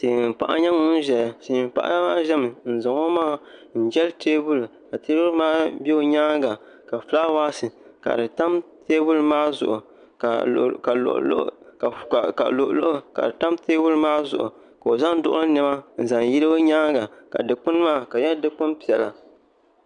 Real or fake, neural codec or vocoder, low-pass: real; none; 9.9 kHz